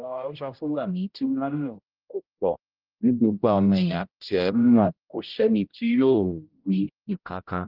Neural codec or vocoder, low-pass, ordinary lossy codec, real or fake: codec, 16 kHz, 0.5 kbps, X-Codec, HuBERT features, trained on general audio; 5.4 kHz; Opus, 24 kbps; fake